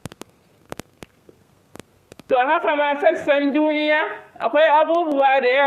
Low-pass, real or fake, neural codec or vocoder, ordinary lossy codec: 14.4 kHz; fake; codec, 32 kHz, 1.9 kbps, SNAC; Opus, 64 kbps